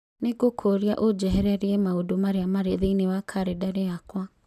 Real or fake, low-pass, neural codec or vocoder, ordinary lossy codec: real; 14.4 kHz; none; none